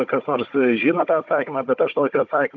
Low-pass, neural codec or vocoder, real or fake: 7.2 kHz; codec, 16 kHz, 16 kbps, FunCodec, trained on Chinese and English, 50 frames a second; fake